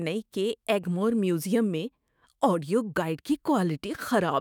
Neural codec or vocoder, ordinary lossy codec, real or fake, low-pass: autoencoder, 48 kHz, 128 numbers a frame, DAC-VAE, trained on Japanese speech; none; fake; none